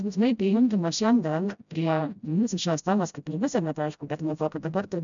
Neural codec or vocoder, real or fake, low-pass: codec, 16 kHz, 0.5 kbps, FreqCodec, smaller model; fake; 7.2 kHz